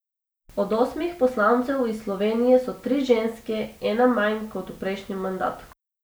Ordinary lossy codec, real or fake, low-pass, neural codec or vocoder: none; real; none; none